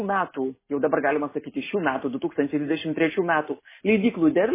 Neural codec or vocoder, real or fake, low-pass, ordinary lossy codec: none; real; 3.6 kHz; MP3, 16 kbps